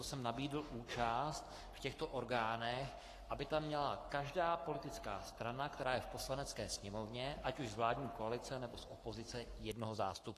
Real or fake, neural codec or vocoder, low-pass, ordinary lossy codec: fake; codec, 44.1 kHz, 7.8 kbps, Pupu-Codec; 14.4 kHz; AAC, 48 kbps